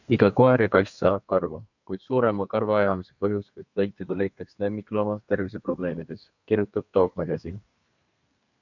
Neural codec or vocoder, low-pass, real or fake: codec, 32 kHz, 1.9 kbps, SNAC; 7.2 kHz; fake